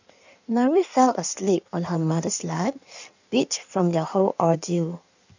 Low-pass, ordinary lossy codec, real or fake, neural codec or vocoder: 7.2 kHz; none; fake; codec, 16 kHz in and 24 kHz out, 1.1 kbps, FireRedTTS-2 codec